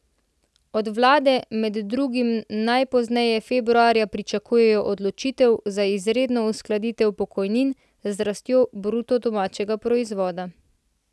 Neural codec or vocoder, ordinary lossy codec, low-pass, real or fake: none; none; none; real